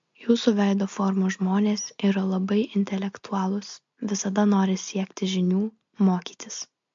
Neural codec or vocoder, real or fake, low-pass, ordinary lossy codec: none; real; 7.2 kHz; MP3, 48 kbps